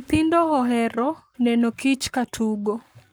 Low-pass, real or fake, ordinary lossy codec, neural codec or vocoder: none; fake; none; codec, 44.1 kHz, 7.8 kbps, Pupu-Codec